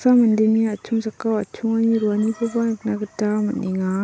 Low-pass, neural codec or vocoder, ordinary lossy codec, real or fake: none; none; none; real